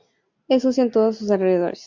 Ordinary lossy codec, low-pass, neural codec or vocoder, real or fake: MP3, 64 kbps; 7.2 kHz; none; real